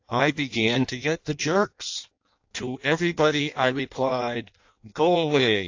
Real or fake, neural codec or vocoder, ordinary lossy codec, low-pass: fake; codec, 16 kHz in and 24 kHz out, 0.6 kbps, FireRedTTS-2 codec; AAC, 48 kbps; 7.2 kHz